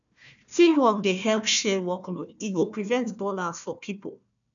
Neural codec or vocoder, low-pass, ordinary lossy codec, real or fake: codec, 16 kHz, 1 kbps, FunCodec, trained on Chinese and English, 50 frames a second; 7.2 kHz; none; fake